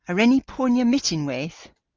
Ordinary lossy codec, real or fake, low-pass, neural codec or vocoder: Opus, 24 kbps; real; 7.2 kHz; none